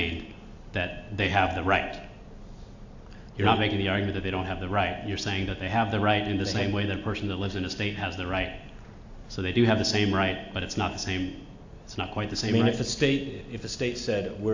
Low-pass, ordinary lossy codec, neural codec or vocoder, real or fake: 7.2 kHz; AAC, 48 kbps; none; real